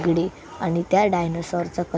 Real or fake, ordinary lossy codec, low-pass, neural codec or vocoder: real; none; none; none